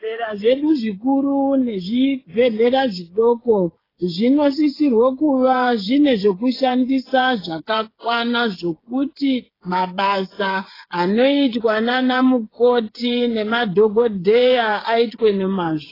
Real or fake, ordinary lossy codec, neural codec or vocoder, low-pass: fake; AAC, 24 kbps; codec, 16 kHz, 8 kbps, FreqCodec, smaller model; 5.4 kHz